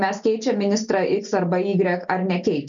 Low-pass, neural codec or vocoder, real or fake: 7.2 kHz; none; real